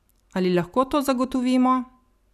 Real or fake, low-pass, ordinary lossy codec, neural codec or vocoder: real; 14.4 kHz; none; none